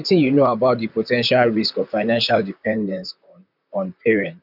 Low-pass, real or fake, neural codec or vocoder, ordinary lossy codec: 5.4 kHz; fake; vocoder, 44.1 kHz, 128 mel bands, Pupu-Vocoder; none